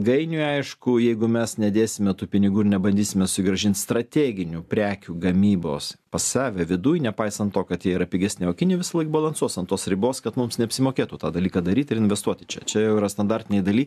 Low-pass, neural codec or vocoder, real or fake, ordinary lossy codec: 14.4 kHz; none; real; AAC, 96 kbps